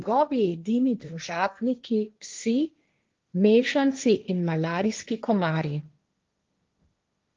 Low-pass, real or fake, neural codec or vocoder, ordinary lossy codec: 7.2 kHz; fake; codec, 16 kHz, 1.1 kbps, Voila-Tokenizer; Opus, 32 kbps